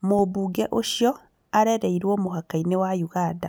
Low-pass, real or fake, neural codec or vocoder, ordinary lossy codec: none; real; none; none